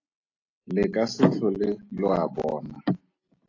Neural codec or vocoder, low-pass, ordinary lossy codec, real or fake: none; 7.2 kHz; AAC, 32 kbps; real